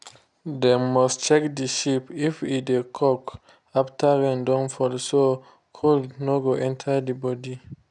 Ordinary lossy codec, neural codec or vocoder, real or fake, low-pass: none; none; real; 10.8 kHz